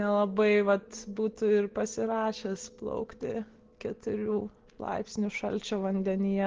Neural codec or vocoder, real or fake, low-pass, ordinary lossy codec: none; real; 7.2 kHz; Opus, 16 kbps